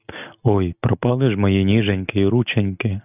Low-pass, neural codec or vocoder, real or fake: 3.6 kHz; none; real